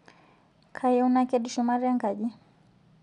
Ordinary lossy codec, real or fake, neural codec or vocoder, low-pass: none; real; none; 10.8 kHz